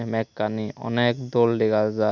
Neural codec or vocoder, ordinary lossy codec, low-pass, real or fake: none; none; 7.2 kHz; real